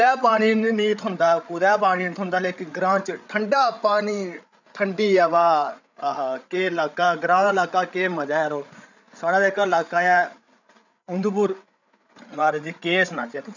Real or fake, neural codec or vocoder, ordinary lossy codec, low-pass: fake; codec, 16 kHz, 8 kbps, FreqCodec, larger model; none; 7.2 kHz